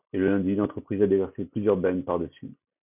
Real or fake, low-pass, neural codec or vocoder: real; 3.6 kHz; none